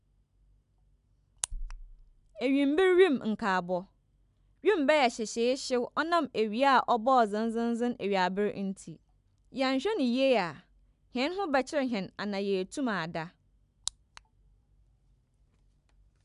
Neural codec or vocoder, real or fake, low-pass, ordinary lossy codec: none; real; 10.8 kHz; none